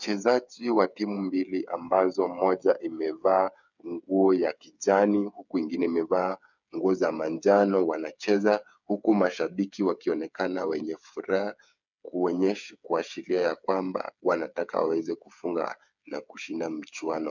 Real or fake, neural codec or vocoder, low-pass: fake; codec, 16 kHz, 8 kbps, FreqCodec, smaller model; 7.2 kHz